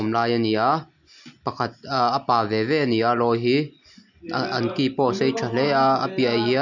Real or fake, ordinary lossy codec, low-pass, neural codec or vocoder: real; none; 7.2 kHz; none